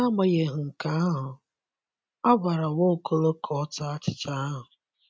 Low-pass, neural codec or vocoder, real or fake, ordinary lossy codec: none; none; real; none